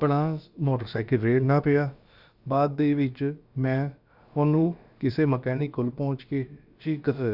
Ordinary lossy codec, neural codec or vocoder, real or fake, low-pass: Opus, 64 kbps; codec, 16 kHz, about 1 kbps, DyCAST, with the encoder's durations; fake; 5.4 kHz